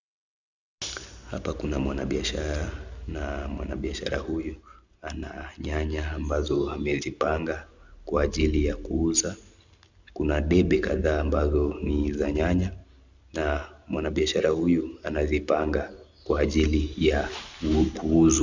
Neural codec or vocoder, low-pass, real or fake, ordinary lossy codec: none; 7.2 kHz; real; Opus, 64 kbps